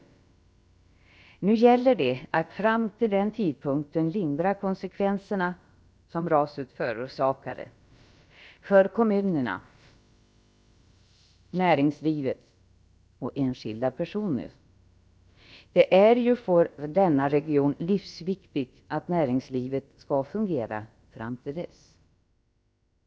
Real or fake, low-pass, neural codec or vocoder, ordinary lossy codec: fake; none; codec, 16 kHz, about 1 kbps, DyCAST, with the encoder's durations; none